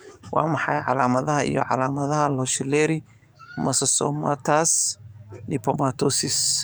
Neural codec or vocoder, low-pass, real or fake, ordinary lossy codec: codec, 44.1 kHz, 7.8 kbps, DAC; none; fake; none